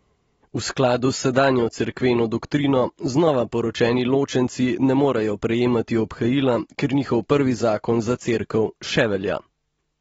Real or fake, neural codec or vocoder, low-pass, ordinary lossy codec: real; none; 9.9 kHz; AAC, 24 kbps